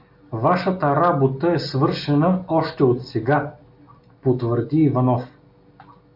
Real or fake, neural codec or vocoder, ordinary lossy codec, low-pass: real; none; AAC, 48 kbps; 5.4 kHz